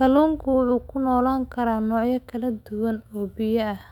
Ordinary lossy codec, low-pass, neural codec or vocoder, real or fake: none; 19.8 kHz; autoencoder, 48 kHz, 128 numbers a frame, DAC-VAE, trained on Japanese speech; fake